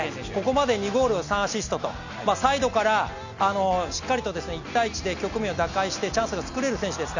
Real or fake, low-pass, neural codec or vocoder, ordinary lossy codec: real; 7.2 kHz; none; none